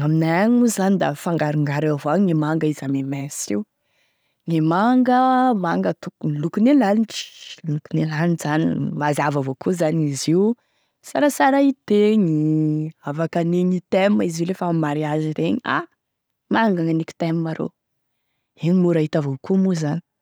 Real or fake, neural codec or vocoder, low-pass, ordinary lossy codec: real; none; none; none